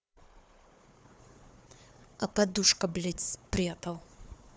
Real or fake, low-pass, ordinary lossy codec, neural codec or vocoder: fake; none; none; codec, 16 kHz, 4 kbps, FunCodec, trained on Chinese and English, 50 frames a second